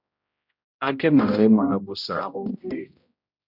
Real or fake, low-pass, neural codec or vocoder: fake; 5.4 kHz; codec, 16 kHz, 0.5 kbps, X-Codec, HuBERT features, trained on general audio